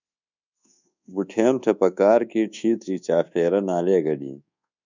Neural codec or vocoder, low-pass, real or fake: codec, 24 kHz, 1.2 kbps, DualCodec; 7.2 kHz; fake